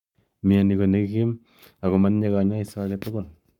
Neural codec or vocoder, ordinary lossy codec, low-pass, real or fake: codec, 44.1 kHz, 7.8 kbps, Pupu-Codec; none; 19.8 kHz; fake